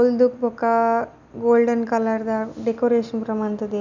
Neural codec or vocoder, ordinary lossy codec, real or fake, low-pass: none; none; real; 7.2 kHz